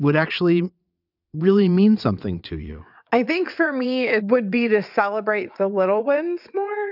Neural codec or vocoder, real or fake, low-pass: vocoder, 22.05 kHz, 80 mel bands, WaveNeXt; fake; 5.4 kHz